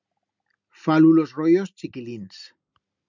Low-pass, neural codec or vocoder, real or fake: 7.2 kHz; none; real